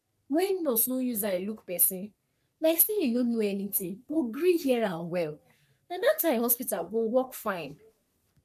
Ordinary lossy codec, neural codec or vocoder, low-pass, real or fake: none; codec, 44.1 kHz, 3.4 kbps, Pupu-Codec; 14.4 kHz; fake